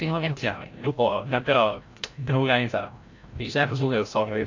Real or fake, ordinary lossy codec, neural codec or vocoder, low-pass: fake; AAC, 48 kbps; codec, 16 kHz, 0.5 kbps, FreqCodec, larger model; 7.2 kHz